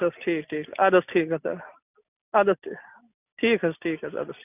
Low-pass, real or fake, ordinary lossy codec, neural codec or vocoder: 3.6 kHz; real; none; none